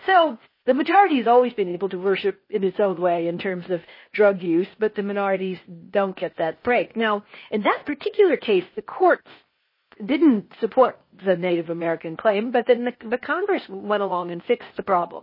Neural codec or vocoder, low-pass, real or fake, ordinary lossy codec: codec, 16 kHz, 0.8 kbps, ZipCodec; 5.4 kHz; fake; MP3, 24 kbps